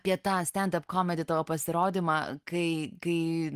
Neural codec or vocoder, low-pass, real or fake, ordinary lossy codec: none; 14.4 kHz; real; Opus, 24 kbps